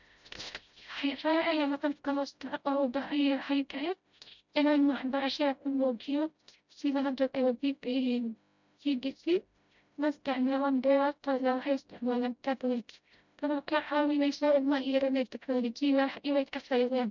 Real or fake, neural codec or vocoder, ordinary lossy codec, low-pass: fake; codec, 16 kHz, 0.5 kbps, FreqCodec, smaller model; none; 7.2 kHz